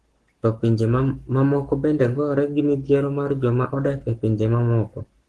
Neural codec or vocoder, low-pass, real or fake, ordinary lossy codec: codec, 44.1 kHz, 7.8 kbps, Pupu-Codec; 10.8 kHz; fake; Opus, 16 kbps